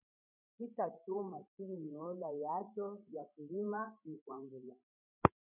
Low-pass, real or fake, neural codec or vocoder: 3.6 kHz; fake; codec, 16 kHz, 16 kbps, FreqCodec, larger model